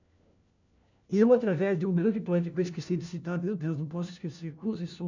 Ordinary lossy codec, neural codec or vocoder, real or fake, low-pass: none; codec, 16 kHz, 1 kbps, FunCodec, trained on LibriTTS, 50 frames a second; fake; 7.2 kHz